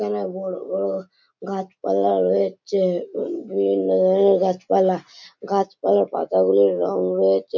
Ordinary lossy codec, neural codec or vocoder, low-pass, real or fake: none; none; 7.2 kHz; real